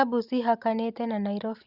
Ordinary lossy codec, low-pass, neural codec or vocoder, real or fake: Opus, 64 kbps; 5.4 kHz; none; real